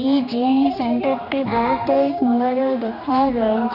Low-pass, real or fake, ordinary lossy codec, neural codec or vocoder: 5.4 kHz; fake; none; codec, 16 kHz, 2 kbps, X-Codec, HuBERT features, trained on general audio